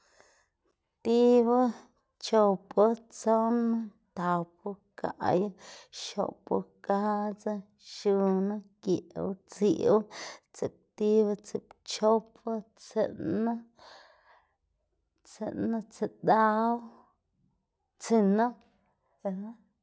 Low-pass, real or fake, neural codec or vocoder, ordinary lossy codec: none; real; none; none